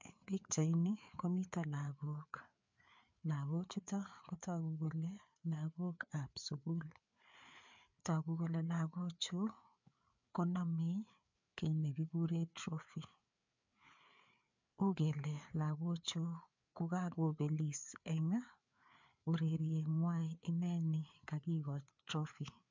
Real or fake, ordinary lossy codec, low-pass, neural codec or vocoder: fake; MP3, 64 kbps; 7.2 kHz; codec, 16 kHz, 4 kbps, FreqCodec, larger model